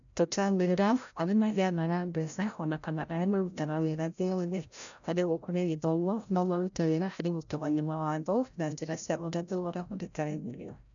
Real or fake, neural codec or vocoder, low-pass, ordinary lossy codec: fake; codec, 16 kHz, 0.5 kbps, FreqCodec, larger model; 7.2 kHz; none